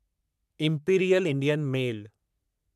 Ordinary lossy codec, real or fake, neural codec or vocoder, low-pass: none; fake; codec, 44.1 kHz, 3.4 kbps, Pupu-Codec; 14.4 kHz